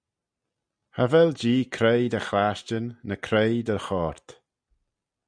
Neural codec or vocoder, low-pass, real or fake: none; 9.9 kHz; real